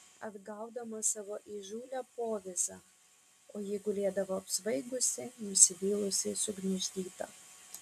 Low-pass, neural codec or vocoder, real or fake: 14.4 kHz; none; real